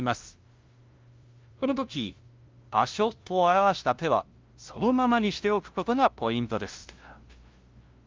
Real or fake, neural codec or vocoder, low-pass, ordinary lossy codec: fake; codec, 16 kHz, 0.5 kbps, FunCodec, trained on LibriTTS, 25 frames a second; 7.2 kHz; Opus, 24 kbps